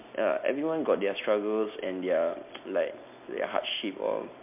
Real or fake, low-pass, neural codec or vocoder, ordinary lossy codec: real; 3.6 kHz; none; MP3, 32 kbps